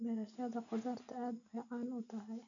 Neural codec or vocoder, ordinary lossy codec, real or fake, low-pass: none; none; real; 7.2 kHz